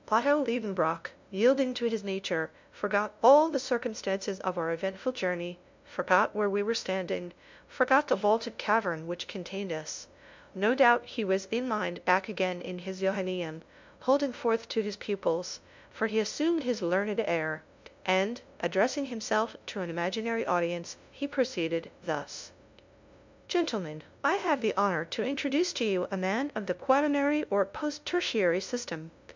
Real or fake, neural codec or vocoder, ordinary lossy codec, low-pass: fake; codec, 16 kHz, 0.5 kbps, FunCodec, trained on LibriTTS, 25 frames a second; MP3, 64 kbps; 7.2 kHz